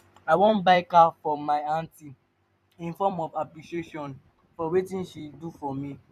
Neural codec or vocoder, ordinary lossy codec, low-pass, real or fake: vocoder, 44.1 kHz, 128 mel bands every 256 samples, BigVGAN v2; none; 14.4 kHz; fake